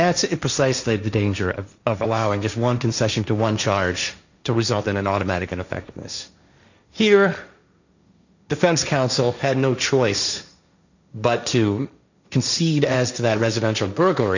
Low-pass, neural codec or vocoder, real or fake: 7.2 kHz; codec, 16 kHz, 1.1 kbps, Voila-Tokenizer; fake